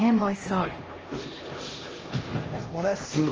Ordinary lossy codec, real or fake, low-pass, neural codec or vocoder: Opus, 24 kbps; fake; 7.2 kHz; codec, 16 kHz, 1 kbps, X-Codec, HuBERT features, trained on LibriSpeech